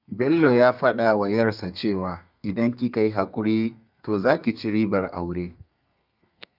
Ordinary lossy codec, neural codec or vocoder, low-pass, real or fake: none; codec, 32 kHz, 1.9 kbps, SNAC; 5.4 kHz; fake